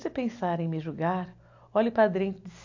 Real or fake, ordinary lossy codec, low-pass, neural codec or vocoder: real; AAC, 48 kbps; 7.2 kHz; none